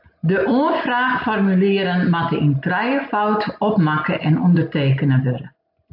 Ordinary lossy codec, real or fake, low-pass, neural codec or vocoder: AAC, 48 kbps; real; 5.4 kHz; none